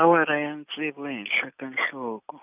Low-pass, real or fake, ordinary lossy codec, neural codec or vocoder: 3.6 kHz; real; none; none